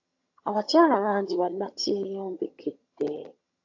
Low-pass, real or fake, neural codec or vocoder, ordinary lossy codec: 7.2 kHz; fake; vocoder, 22.05 kHz, 80 mel bands, HiFi-GAN; AAC, 48 kbps